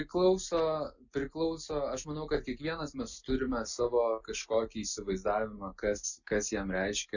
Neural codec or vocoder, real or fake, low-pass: none; real; 7.2 kHz